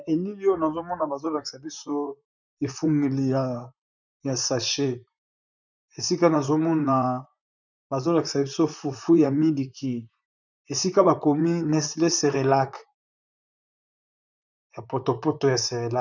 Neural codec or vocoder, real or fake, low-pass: vocoder, 44.1 kHz, 128 mel bands, Pupu-Vocoder; fake; 7.2 kHz